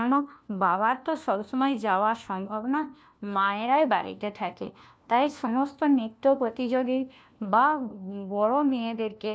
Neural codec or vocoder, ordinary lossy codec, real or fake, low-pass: codec, 16 kHz, 1 kbps, FunCodec, trained on LibriTTS, 50 frames a second; none; fake; none